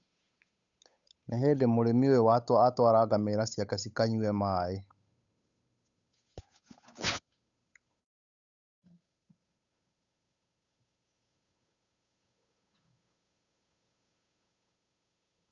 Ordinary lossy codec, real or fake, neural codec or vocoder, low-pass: none; fake; codec, 16 kHz, 8 kbps, FunCodec, trained on Chinese and English, 25 frames a second; 7.2 kHz